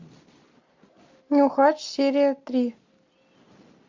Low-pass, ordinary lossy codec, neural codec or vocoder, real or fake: 7.2 kHz; MP3, 48 kbps; none; real